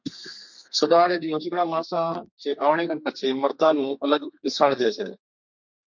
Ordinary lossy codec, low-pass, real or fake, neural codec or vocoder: MP3, 48 kbps; 7.2 kHz; fake; codec, 32 kHz, 1.9 kbps, SNAC